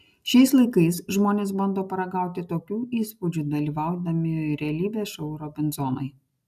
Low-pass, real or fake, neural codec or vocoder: 14.4 kHz; real; none